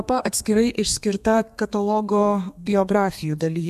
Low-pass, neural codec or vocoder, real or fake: 14.4 kHz; codec, 32 kHz, 1.9 kbps, SNAC; fake